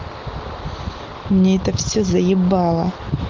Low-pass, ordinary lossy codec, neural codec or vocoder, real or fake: 7.2 kHz; Opus, 16 kbps; none; real